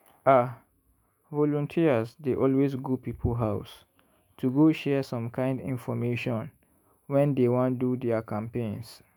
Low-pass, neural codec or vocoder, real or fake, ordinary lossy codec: 19.8 kHz; autoencoder, 48 kHz, 128 numbers a frame, DAC-VAE, trained on Japanese speech; fake; MP3, 96 kbps